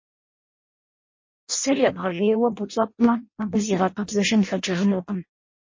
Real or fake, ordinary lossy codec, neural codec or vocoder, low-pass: fake; MP3, 32 kbps; codec, 16 kHz in and 24 kHz out, 0.6 kbps, FireRedTTS-2 codec; 7.2 kHz